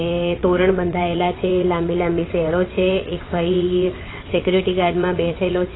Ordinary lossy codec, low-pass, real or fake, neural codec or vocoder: AAC, 16 kbps; 7.2 kHz; fake; vocoder, 22.05 kHz, 80 mel bands, WaveNeXt